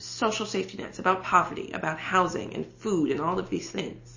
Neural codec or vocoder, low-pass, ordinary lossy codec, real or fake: none; 7.2 kHz; MP3, 32 kbps; real